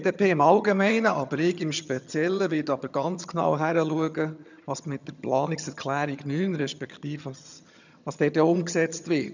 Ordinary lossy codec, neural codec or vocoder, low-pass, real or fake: none; vocoder, 22.05 kHz, 80 mel bands, HiFi-GAN; 7.2 kHz; fake